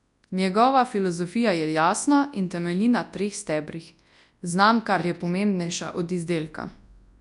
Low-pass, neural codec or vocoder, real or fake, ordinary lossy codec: 10.8 kHz; codec, 24 kHz, 0.9 kbps, WavTokenizer, large speech release; fake; none